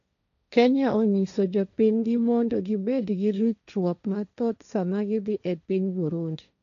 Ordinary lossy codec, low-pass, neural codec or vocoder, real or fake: none; 7.2 kHz; codec, 16 kHz, 1.1 kbps, Voila-Tokenizer; fake